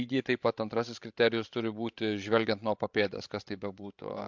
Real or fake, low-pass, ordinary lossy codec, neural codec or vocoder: fake; 7.2 kHz; MP3, 64 kbps; codec, 16 kHz, 16 kbps, FreqCodec, larger model